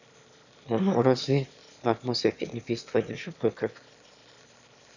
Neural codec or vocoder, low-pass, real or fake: autoencoder, 22.05 kHz, a latent of 192 numbers a frame, VITS, trained on one speaker; 7.2 kHz; fake